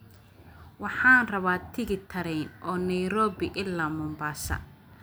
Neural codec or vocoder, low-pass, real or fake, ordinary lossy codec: none; none; real; none